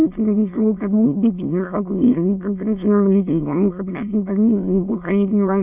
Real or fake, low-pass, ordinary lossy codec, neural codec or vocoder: fake; 3.6 kHz; none; autoencoder, 22.05 kHz, a latent of 192 numbers a frame, VITS, trained on many speakers